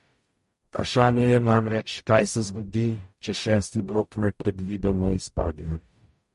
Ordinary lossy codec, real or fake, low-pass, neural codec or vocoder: MP3, 48 kbps; fake; 14.4 kHz; codec, 44.1 kHz, 0.9 kbps, DAC